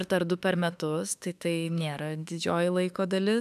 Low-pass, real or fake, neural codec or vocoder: 14.4 kHz; fake; autoencoder, 48 kHz, 32 numbers a frame, DAC-VAE, trained on Japanese speech